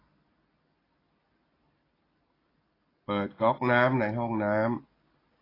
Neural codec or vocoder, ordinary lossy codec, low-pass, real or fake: none; AAC, 24 kbps; 5.4 kHz; real